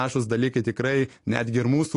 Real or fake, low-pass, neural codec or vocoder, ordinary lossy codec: real; 10.8 kHz; none; AAC, 48 kbps